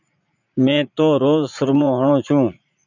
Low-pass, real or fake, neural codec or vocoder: 7.2 kHz; fake; vocoder, 44.1 kHz, 80 mel bands, Vocos